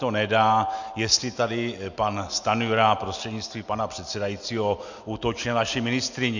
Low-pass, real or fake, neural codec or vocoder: 7.2 kHz; real; none